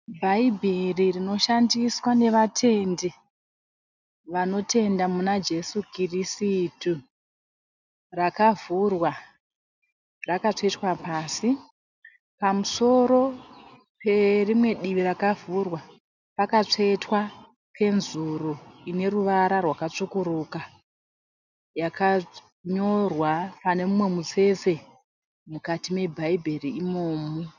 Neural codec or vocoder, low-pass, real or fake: none; 7.2 kHz; real